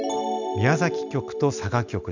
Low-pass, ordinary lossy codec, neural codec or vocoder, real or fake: 7.2 kHz; none; none; real